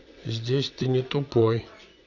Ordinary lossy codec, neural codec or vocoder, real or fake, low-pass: none; vocoder, 22.05 kHz, 80 mel bands, WaveNeXt; fake; 7.2 kHz